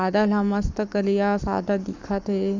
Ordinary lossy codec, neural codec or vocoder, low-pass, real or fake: none; codec, 44.1 kHz, 7.8 kbps, Pupu-Codec; 7.2 kHz; fake